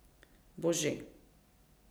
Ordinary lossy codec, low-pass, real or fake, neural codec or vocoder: none; none; fake; vocoder, 44.1 kHz, 128 mel bands every 256 samples, BigVGAN v2